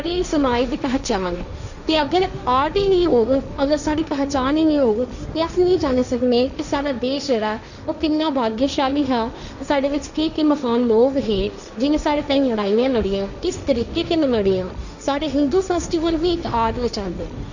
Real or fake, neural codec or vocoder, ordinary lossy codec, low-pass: fake; codec, 16 kHz, 1.1 kbps, Voila-Tokenizer; none; 7.2 kHz